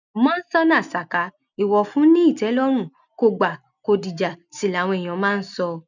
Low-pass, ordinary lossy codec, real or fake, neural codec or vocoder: 7.2 kHz; none; real; none